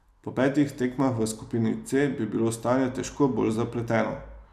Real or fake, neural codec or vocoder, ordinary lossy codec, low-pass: real; none; none; 14.4 kHz